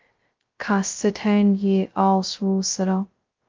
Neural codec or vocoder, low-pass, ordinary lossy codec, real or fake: codec, 16 kHz, 0.2 kbps, FocalCodec; 7.2 kHz; Opus, 32 kbps; fake